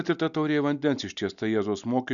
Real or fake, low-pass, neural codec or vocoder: real; 7.2 kHz; none